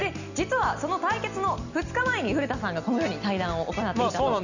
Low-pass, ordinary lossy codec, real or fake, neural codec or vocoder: 7.2 kHz; none; real; none